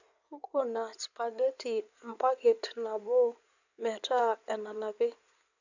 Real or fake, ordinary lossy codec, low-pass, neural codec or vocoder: fake; none; 7.2 kHz; codec, 16 kHz in and 24 kHz out, 2.2 kbps, FireRedTTS-2 codec